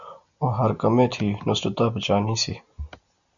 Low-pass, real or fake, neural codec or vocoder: 7.2 kHz; real; none